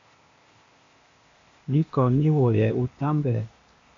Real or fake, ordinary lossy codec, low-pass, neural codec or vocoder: fake; AAC, 48 kbps; 7.2 kHz; codec, 16 kHz, 0.8 kbps, ZipCodec